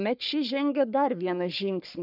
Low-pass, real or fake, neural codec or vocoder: 5.4 kHz; fake; codec, 44.1 kHz, 3.4 kbps, Pupu-Codec